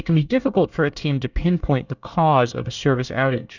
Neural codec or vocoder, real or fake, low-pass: codec, 24 kHz, 1 kbps, SNAC; fake; 7.2 kHz